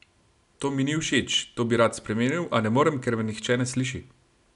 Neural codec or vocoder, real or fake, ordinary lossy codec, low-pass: none; real; none; 10.8 kHz